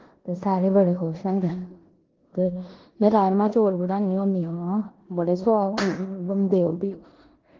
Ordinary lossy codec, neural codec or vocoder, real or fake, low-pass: Opus, 16 kbps; codec, 16 kHz in and 24 kHz out, 0.9 kbps, LongCat-Audio-Codec, four codebook decoder; fake; 7.2 kHz